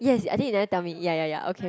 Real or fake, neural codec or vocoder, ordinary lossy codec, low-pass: real; none; none; none